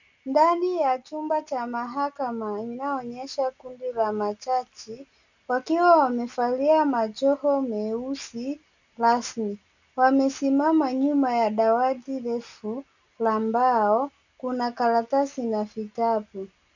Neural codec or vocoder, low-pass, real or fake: none; 7.2 kHz; real